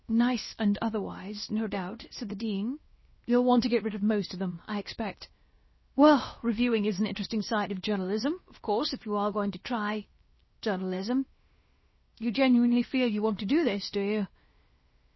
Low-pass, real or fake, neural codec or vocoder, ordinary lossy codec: 7.2 kHz; fake; codec, 16 kHz, about 1 kbps, DyCAST, with the encoder's durations; MP3, 24 kbps